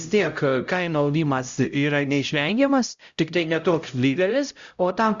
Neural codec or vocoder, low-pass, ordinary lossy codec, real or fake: codec, 16 kHz, 0.5 kbps, X-Codec, HuBERT features, trained on LibriSpeech; 7.2 kHz; Opus, 64 kbps; fake